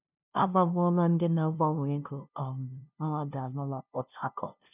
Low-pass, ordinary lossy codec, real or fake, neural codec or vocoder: 3.6 kHz; none; fake; codec, 16 kHz, 0.5 kbps, FunCodec, trained on LibriTTS, 25 frames a second